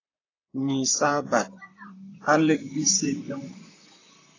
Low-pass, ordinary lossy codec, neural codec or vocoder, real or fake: 7.2 kHz; AAC, 32 kbps; vocoder, 44.1 kHz, 128 mel bands, Pupu-Vocoder; fake